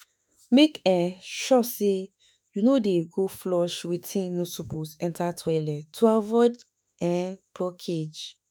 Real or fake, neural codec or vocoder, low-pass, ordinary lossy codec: fake; autoencoder, 48 kHz, 32 numbers a frame, DAC-VAE, trained on Japanese speech; none; none